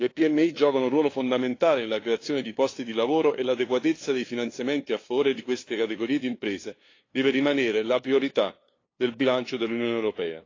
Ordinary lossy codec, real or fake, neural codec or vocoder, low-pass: AAC, 32 kbps; fake; codec, 16 kHz, 2 kbps, FunCodec, trained on LibriTTS, 25 frames a second; 7.2 kHz